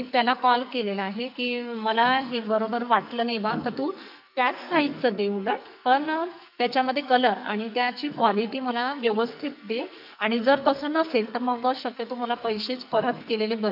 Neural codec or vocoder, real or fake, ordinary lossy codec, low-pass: codec, 44.1 kHz, 2.6 kbps, SNAC; fake; none; 5.4 kHz